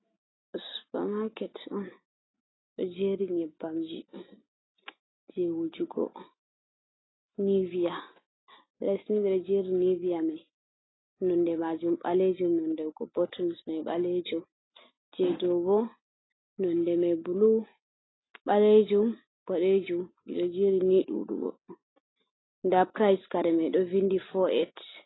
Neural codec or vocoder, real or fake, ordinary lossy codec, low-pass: none; real; AAC, 16 kbps; 7.2 kHz